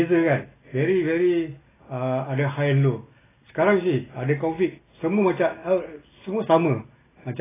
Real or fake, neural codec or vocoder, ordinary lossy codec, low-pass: real; none; AAC, 16 kbps; 3.6 kHz